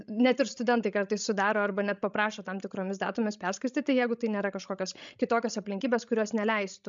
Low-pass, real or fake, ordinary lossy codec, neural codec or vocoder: 7.2 kHz; fake; MP3, 64 kbps; codec, 16 kHz, 16 kbps, FreqCodec, larger model